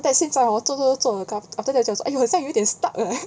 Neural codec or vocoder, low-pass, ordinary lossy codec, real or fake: none; none; none; real